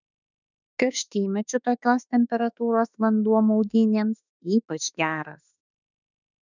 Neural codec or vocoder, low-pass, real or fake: autoencoder, 48 kHz, 32 numbers a frame, DAC-VAE, trained on Japanese speech; 7.2 kHz; fake